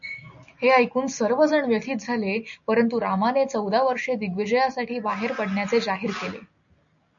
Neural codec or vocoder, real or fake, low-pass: none; real; 7.2 kHz